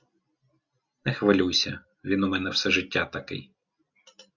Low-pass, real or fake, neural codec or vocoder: 7.2 kHz; real; none